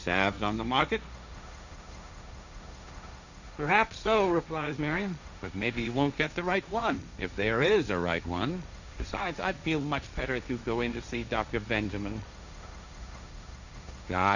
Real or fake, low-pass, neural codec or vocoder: fake; 7.2 kHz; codec, 16 kHz, 1.1 kbps, Voila-Tokenizer